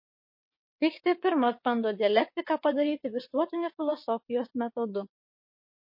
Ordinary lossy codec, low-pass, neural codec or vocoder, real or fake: MP3, 32 kbps; 5.4 kHz; vocoder, 22.05 kHz, 80 mel bands, WaveNeXt; fake